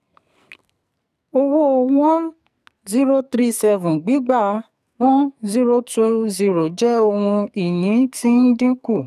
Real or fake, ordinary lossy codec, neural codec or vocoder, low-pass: fake; none; codec, 44.1 kHz, 2.6 kbps, SNAC; 14.4 kHz